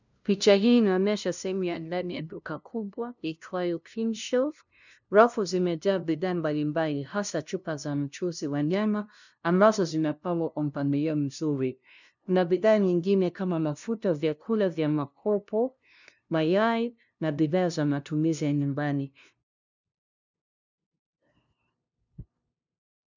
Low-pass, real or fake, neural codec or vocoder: 7.2 kHz; fake; codec, 16 kHz, 0.5 kbps, FunCodec, trained on LibriTTS, 25 frames a second